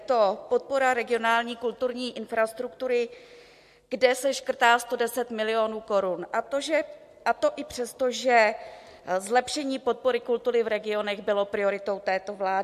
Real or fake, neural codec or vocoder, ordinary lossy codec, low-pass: real; none; MP3, 64 kbps; 14.4 kHz